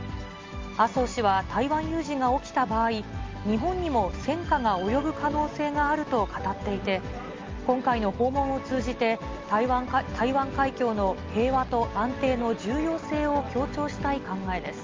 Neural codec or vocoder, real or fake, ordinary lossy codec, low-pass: none; real; Opus, 32 kbps; 7.2 kHz